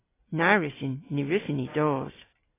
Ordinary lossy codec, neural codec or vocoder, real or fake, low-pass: AAC, 16 kbps; none; real; 3.6 kHz